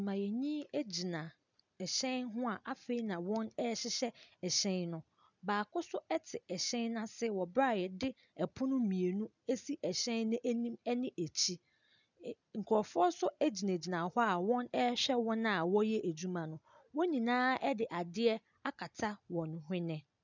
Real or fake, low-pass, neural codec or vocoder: real; 7.2 kHz; none